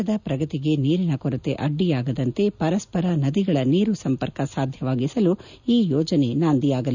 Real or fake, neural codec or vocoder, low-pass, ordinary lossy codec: real; none; 7.2 kHz; none